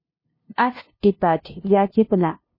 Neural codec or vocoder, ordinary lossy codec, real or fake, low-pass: codec, 16 kHz, 0.5 kbps, FunCodec, trained on LibriTTS, 25 frames a second; MP3, 24 kbps; fake; 5.4 kHz